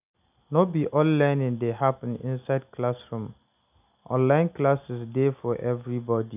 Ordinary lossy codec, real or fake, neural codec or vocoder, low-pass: none; real; none; 3.6 kHz